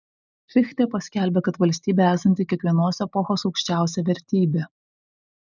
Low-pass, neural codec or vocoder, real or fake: 7.2 kHz; none; real